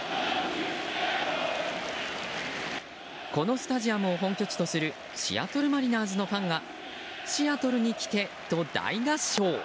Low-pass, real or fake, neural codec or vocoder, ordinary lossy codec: none; real; none; none